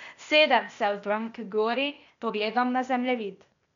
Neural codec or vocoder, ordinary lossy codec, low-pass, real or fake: codec, 16 kHz, 0.8 kbps, ZipCodec; none; 7.2 kHz; fake